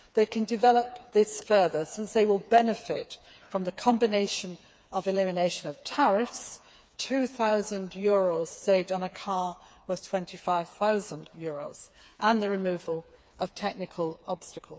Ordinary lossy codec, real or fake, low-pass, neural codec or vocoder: none; fake; none; codec, 16 kHz, 4 kbps, FreqCodec, smaller model